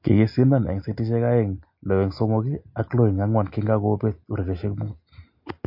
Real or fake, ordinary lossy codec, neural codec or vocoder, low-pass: real; MP3, 32 kbps; none; 5.4 kHz